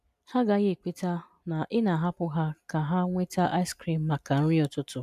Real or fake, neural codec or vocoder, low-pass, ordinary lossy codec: real; none; 14.4 kHz; MP3, 96 kbps